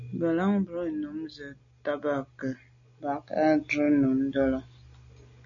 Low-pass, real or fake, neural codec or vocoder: 7.2 kHz; real; none